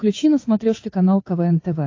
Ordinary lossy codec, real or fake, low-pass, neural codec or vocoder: AAC, 32 kbps; fake; 7.2 kHz; codec, 16 kHz, 8 kbps, FunCodec, trained on Chinese and English, 25 frames a second